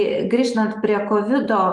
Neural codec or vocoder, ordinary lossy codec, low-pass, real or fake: none; Opus, 32 kbps; 10.8 kHz; real